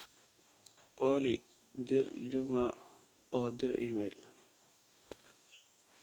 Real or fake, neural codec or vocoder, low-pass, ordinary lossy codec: fake; codec, 44.1 kHz, 2.6 kbps, DAC; 19.8 kHz; Opus, 64 kbps